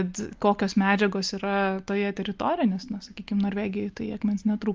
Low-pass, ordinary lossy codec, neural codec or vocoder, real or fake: 7.2 kHz; Opus, 24 kbps; none; real